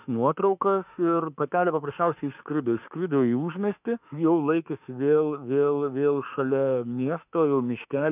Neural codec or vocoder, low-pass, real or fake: autoencoder, 48 kHz, 32 numbers a frame, DAC-VAE, trained on Japanese speech; 3.6 kHz; fake